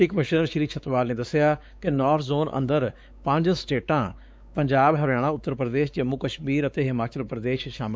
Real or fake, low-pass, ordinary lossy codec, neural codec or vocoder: fake; none; none; codec, 16 kHz, 4 kbps, X-Codec, WavLM features, trained on Multilingual LibriSpeech